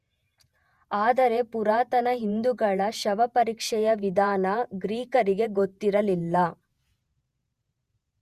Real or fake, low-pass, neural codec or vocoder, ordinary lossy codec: fake; 14.4 kHz; vocoder, 48 kHz, 128 mel bands, Vocos; Opus, 64 kbps